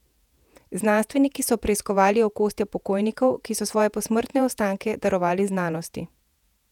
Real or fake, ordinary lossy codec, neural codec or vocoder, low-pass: fake; none; vocoder, 48 kHz, 128 mel bands, Vocos; 19.8 kHz